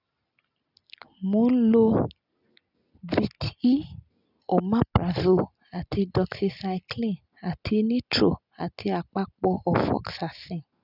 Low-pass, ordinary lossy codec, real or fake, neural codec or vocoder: 5.4 kHz; none; real; none